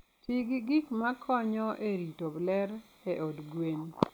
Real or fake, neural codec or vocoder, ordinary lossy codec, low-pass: real; none; none; none